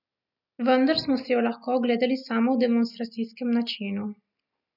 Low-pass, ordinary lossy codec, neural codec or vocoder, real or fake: 5.4 kHz; none; none; real